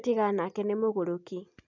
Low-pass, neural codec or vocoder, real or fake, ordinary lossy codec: 7.2 kHz; none; real; none